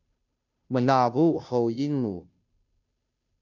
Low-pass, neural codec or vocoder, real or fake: 7.2 kHz; codec, 16 kHz, 0.5 kbps, FunCodec, trained on Chinese and English, 25 frames a second; fake